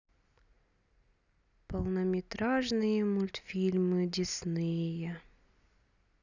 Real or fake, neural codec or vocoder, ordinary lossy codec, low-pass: real; none; none; 7.2 kHz